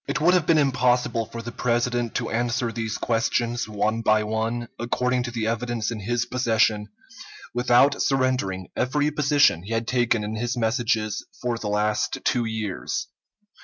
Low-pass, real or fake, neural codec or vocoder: 7.2 kHz; real; none